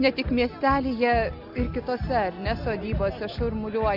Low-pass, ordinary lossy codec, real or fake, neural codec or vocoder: 5.4 kHz; Opus, 64 kbps; real; none